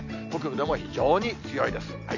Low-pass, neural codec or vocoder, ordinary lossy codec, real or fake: 7.2 kHz; none; none; real